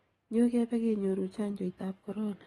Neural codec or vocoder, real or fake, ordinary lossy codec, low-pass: codec, 44.1 kHz, 7.8 kbps, DAC; fake; AAC, 32 kbps; 19.8 kHz